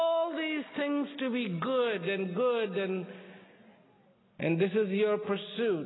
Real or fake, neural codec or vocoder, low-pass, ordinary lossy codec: real; none; 7.2 kHz; AAC, 16 kbps